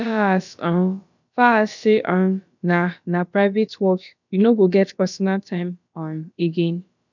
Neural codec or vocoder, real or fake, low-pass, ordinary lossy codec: codec, 16 kHz, about 1 kbps, DyCAST, with the encoder's durations; fake; 7.2 kHz; none